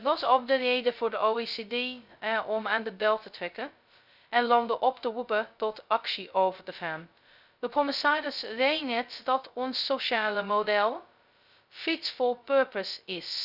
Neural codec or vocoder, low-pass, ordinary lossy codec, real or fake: codec, 16 kHz, 0.2 kbps, FocalCodec; 5.4 kHz; none; fake